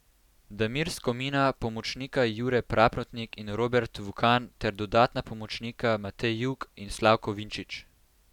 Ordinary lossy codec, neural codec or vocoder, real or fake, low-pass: none; none; real; 19.8 kHz